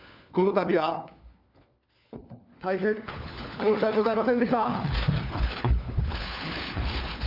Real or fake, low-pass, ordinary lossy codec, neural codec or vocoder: fake; 5.4 kHz; none; codec, 16 kHz, 4 kbps, FunCodec, trained on LibriTTS, 50 frames a second